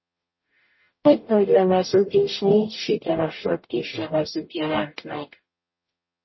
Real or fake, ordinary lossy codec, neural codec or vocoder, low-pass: fake; MP3, 24 kbps; codec, 44.1 kHz, 0.9 kbps, DAC; 7.2 kHz